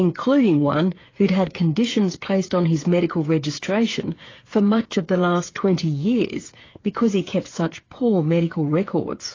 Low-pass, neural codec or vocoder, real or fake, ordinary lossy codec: 7.2 kHz; codec, 16 kHz, 8 kbps, FreqCodec, smaller model; fake; AAC, 32 kbps